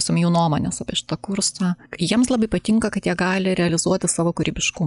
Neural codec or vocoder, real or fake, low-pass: none; real; 10.8 kHz